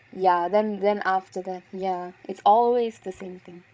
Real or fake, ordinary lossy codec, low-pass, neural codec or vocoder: fake; none; none; codec, 16 kHz, 16 kbps, FreqCodec, larger model